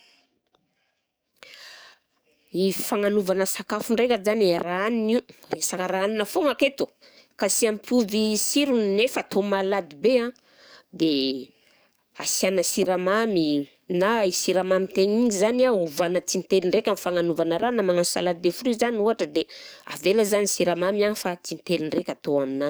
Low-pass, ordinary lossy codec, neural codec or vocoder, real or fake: none; none; codec, 44.1 kHz, 7.8 kbps, DAC; fake